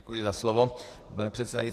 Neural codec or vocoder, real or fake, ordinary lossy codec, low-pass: codec, 44.1 kHz, 2.6 kbps, SNAC; fake; AAC, 64 kbps; 14.4 kHz